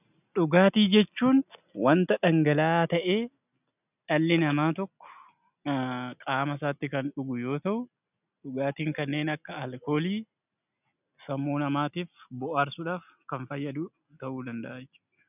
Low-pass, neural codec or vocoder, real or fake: 3.6 kHz; none; real